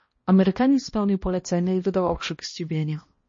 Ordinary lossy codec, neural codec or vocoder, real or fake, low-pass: MP3, 32 kbps; codec, 16 kHz, 1 kbps, X-Codec, HuBERT features, trained on balanced general audio; fake; 7.2 kHz